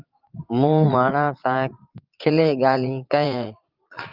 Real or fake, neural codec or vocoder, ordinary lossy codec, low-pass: fake; vocoder, 22.05 kHz, 80 mel bands, Vocos; Opus, 24 kbps; 5.4 kHz